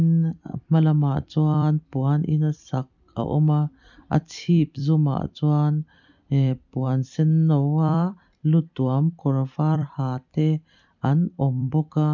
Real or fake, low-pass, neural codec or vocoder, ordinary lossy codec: fake; 7.2 kHz; vocoder, 44.1 kHz, 128 mel bands every 256 samples, BigVGAN v2; none